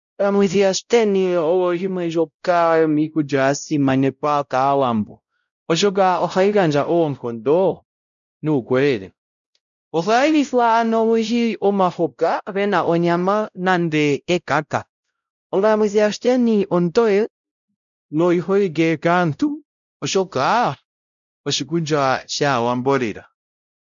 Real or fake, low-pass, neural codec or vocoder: fake; 7.2 kHz; codec, 16 kHz, 0.5 kbps, X-Codec, WavLM features, trained on Multilingual LibriSpeech